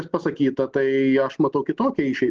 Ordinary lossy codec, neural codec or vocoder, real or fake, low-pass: Opus, 16 kbps; none; real; 7.2 kHz